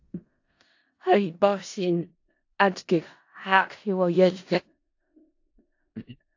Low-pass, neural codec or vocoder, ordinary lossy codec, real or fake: 7.2 kHz; codec, 16 kHz in and 24 kHz out, 0.4 kbps, LongCat-Audio-Codec, four codebook decoder; AAC, 48 kbps; fake